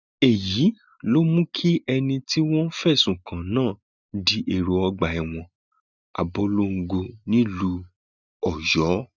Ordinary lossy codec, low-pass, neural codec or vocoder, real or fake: none; 7.2 kHz; none; real